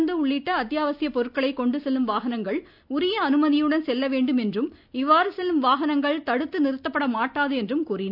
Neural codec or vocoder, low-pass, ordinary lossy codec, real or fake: none; 5.4 kHz; none; real